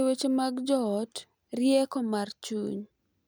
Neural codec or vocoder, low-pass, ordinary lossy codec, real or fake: none; none; none; real